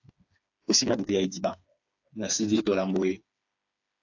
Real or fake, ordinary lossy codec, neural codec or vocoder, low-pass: fake; AAC, 48 kbps; codec, 16 kHz, 4 kbps, FreqCodec, smaller model; 7.2 kHz